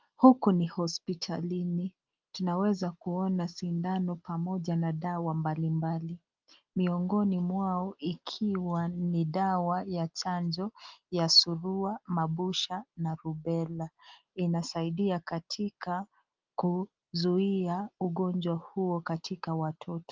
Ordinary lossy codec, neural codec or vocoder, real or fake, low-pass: Opus, 32 kbps; none; real; 7.2 kHz